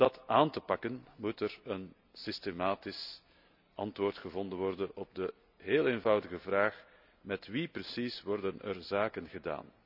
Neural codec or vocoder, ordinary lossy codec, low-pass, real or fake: none; none; 5.4 kHz; real